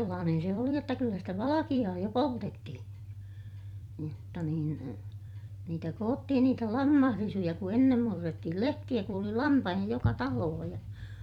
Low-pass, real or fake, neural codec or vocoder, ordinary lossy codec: 19.8 kHz; fake; vocoder, 44.1 kHz, 128 mel bands every 256 samples, BigVGAN v2; none